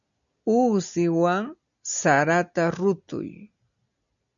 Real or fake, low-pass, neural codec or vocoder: real; 7.2 kHz; none